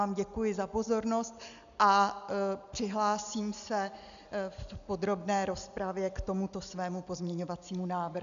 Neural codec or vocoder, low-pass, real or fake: none; 7.2 kHz; real